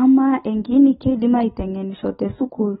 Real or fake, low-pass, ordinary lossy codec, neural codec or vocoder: real; 19.8 kHz; AAC, 16 kbps; none